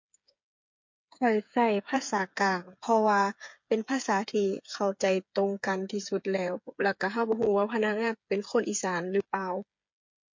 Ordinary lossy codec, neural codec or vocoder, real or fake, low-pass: MP3, 48 kbps; codec, 16 kHz, 8 kbps, FreqCodec, smaller model; fake; 7.2 kHz